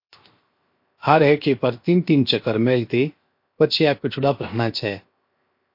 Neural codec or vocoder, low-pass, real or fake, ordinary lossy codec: codec, 16 kHz, 0.7 kbps, FocalCodec; 5.4 kHz; fake; MP3, 32 kbps